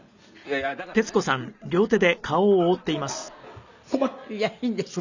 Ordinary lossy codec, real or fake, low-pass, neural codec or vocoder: none; real; 7.2 kHz; none